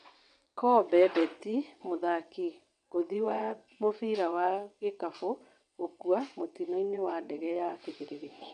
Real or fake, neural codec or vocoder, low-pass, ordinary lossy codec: fake; vocoder, 22.05 kHz, 80 mel bands, WaveNeXt; 9.9 kHz; none